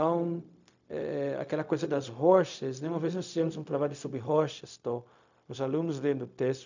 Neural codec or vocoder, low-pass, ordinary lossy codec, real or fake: codec, 16 kHz, 0.4 kbps, LongCat-Audio-Codec; 7.2 kHz; none; fake